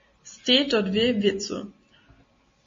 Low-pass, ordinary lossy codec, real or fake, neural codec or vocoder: 7.2 kHz; MP3, 32 kbps; real; none